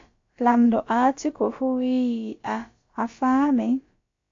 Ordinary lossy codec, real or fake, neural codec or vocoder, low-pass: AAC, 48 kbps; fake; codec, 16 kHz, about 1 kbps, DyCAST, with the encoder's durations; 7.2 kHz